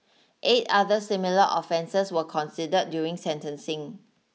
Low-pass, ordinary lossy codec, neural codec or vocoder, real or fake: none; none; none; real